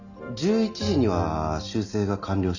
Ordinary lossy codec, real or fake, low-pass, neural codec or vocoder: none; real; 7.2 kHz; none